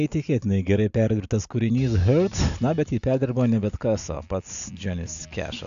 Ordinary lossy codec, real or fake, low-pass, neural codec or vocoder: AAC, 96 kbps; real; 7.2 kHz; none